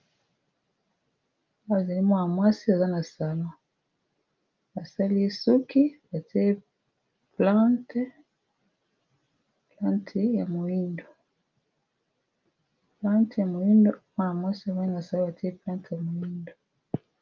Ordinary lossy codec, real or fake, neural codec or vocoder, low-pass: Opus, 24 kbps; real; none; 7.2 kHz